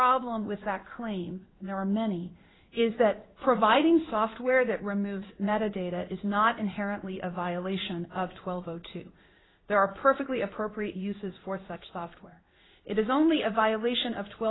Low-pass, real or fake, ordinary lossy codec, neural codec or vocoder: 7.2 kHz; fake; AAC, 16 kbps; codec, 16 kHz in and 24 kHz out, 1 kbps, XY-Tokenizer